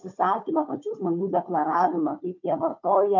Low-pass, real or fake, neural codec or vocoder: 7.2 kHz; fake; codec, 16 kHz, 4 kbps, FunCodec, trained on Chinese and English, 50 frames a second